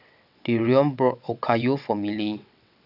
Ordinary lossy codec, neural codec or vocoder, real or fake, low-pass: none; vocoder, 22.05 kHz, 80 mel bands, WaveNeXt; fake; 5.4 kHz